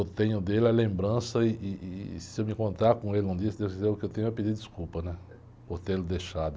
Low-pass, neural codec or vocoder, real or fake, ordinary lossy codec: none; none; real; none